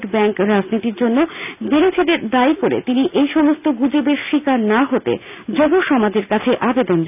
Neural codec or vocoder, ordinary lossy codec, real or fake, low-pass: none; none; real; 3.6 kHz